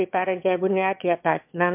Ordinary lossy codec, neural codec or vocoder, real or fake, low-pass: MP3, 32 kbps; autoencoder, 22.05 kHz, a latent of 192 numbers a frame, VITS, trained on one speaker; fake; 3.6 kHz